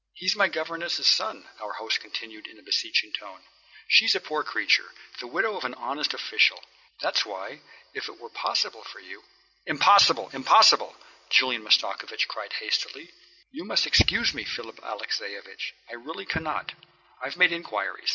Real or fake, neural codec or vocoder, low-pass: real; none; 7.2 kHz